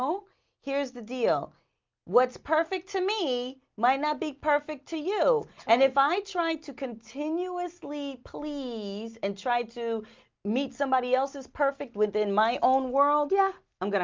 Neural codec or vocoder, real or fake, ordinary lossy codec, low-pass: none; real; Opus, 24 kbps; 7.2 kHz